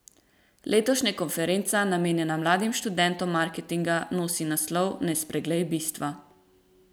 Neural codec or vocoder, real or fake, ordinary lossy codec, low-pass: none; real; none; none